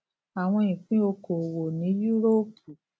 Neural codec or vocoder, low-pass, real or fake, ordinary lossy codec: none; none; real; none